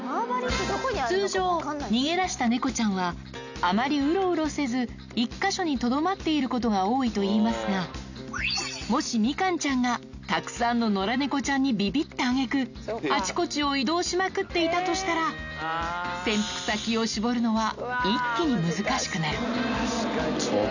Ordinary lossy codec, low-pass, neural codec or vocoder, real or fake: none; 7.2 kHz; none; real